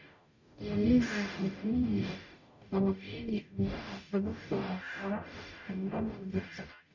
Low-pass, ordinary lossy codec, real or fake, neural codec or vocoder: 7.2 kHz; none; fake; codec, 44.1 kHz, 0.9 kbps, DAC